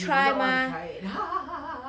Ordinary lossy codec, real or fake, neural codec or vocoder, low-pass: none; real; none; none